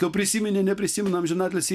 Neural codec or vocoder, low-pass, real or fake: none; 14.4 kHz; real